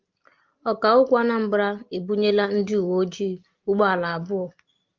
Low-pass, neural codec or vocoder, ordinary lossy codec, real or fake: 7.2 kHz; none; Opus, 24 kbps; real